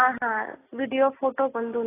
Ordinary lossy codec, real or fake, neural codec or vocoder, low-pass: AAC, 16 kbps; real; none; 3.6 kHz